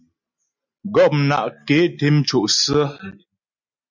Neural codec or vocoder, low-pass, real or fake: none; 7.2 kHz; real